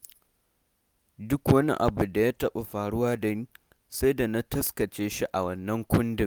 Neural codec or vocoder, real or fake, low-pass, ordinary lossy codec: none; real; none; none